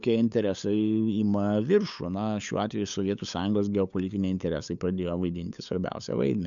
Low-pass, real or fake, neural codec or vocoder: 7.2 kHz; fake; codec, 16 kHz, 8 kbps, FunCodec, trained on LibriTTS, 25 frames a second